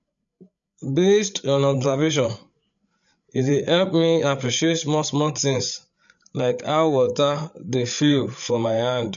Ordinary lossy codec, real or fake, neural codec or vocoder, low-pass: none; fake; codec, 16 kHz, 8 kbps, FreqCodec, larger model; 7.2 kHz